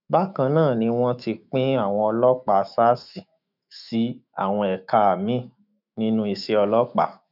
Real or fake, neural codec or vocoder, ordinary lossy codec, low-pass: fake; autoencoder, 48 kHz, 128 numbers a frame, DAC-VAE, trained on Japanese speech; none; 5.4 kHz